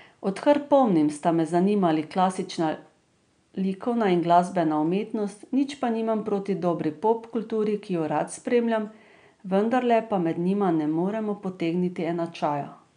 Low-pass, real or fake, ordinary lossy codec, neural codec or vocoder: 9.9 kHz; real; none; none